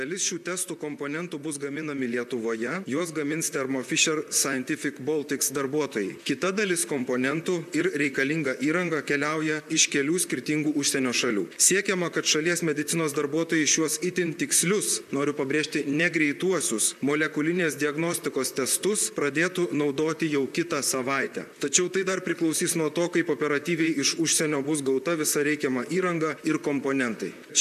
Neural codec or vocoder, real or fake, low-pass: vocoder, 44.1 kHz, 128 mel bands, Pupu-Vocoder; fake; 14.4 kHz